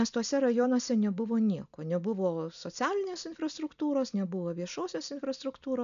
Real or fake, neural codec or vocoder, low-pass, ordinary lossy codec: real; none; 7.2 kHz; MP3, 96 kbps